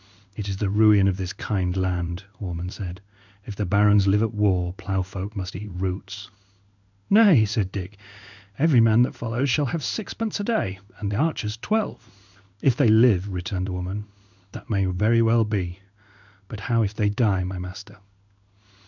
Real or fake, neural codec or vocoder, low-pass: fake; codec, 16 kHz in and 24 kHz out, 1 kbps, XY-Tokenizer; 7.2 kHz